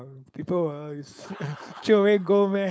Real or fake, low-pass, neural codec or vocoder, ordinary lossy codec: fake; none; codec, 16 kHz, 4.8 kbps, FACodec; none